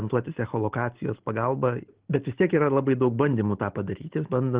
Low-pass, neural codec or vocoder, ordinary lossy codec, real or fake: 3.6 kHz; none; Opus, 16 kbps; real